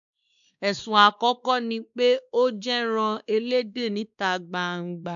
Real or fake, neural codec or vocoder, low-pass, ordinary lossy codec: fake; codec, 16 kHz, 2 kbps, X-Codec, WavLM features, trained on Multilingual LibriSpeech; 7.2 kHz; none